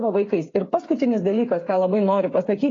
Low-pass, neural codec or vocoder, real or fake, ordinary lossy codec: 7.2 kHz; codec, 16 kHz, 8 kbps, FreqCodec, smaller model; fake; AAC, 32 kbps